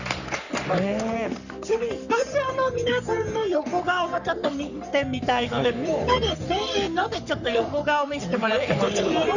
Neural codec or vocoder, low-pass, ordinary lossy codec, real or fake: codec, 44.1 kHz, 3.4 kbps, Pupu-Codec; 7.2 kHz; none; fake